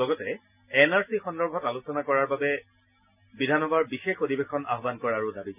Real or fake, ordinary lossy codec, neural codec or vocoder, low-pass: real; none; none; 3.6 kHz